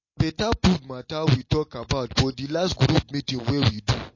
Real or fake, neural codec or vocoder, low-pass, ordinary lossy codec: real; none; 7.2 kHz; MP3, 32 kbps